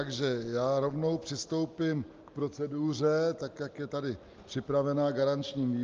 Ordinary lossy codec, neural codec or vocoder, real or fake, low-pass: Opus, 24 kbps; none; real; 7.2 kHz